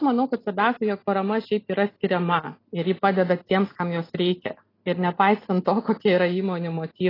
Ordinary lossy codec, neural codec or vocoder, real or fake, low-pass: AAC, 24 kbps; none; real; 5.4 kHz